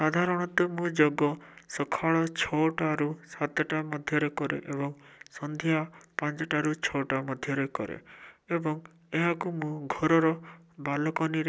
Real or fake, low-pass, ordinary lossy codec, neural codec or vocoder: real; none; none; none